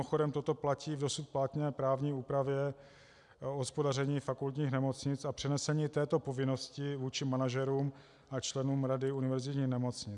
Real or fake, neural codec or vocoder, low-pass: real; none; 10.8 kHz